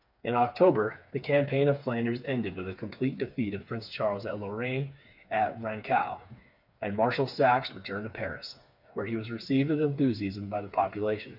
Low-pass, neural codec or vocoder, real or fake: 5.4 kHz; codec, 16 kHz, 4 kbps, FreqCodec, smaller model; fake